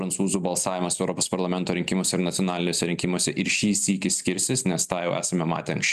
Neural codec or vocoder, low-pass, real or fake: none; 14.4 kHz; real